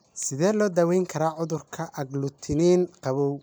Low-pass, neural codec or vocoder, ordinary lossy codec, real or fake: none; none; none; real